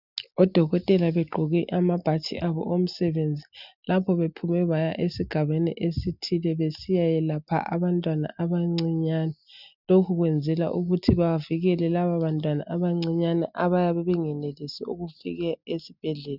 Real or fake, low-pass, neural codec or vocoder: real; 5.4 kHz; none